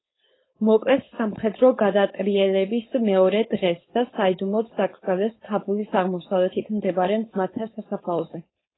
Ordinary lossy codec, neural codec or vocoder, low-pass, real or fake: AAC, 16 kbps; codec, 16 kHz, 4.8 kbps, FACodec; 7.2 kHz; fake